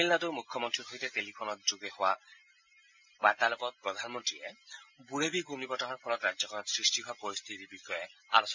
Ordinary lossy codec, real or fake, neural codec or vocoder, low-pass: MP3, 64 kbps; real; none; 7.2 kHz